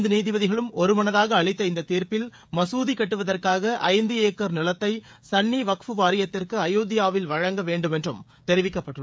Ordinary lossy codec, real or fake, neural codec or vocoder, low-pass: none; fake; codec, 16 kHz, 16 kbps, FreqCodec, smaller model; none